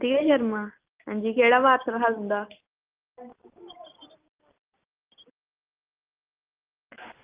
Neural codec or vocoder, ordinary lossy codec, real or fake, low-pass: none; Opus, 32 kbps; real; 3.6 kHz